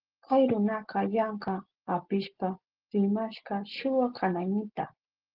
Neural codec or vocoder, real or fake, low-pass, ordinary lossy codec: none; real; 5.4 kHz; Opus, 16 kbps